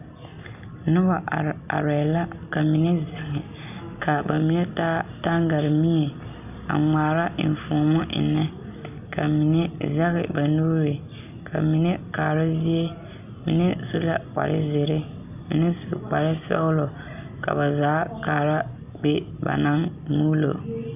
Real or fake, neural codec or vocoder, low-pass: real; none; 3.6 kHz